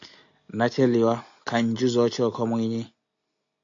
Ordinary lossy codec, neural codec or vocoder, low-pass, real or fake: AAC, 64 kbps; none; 7.2 kHz; real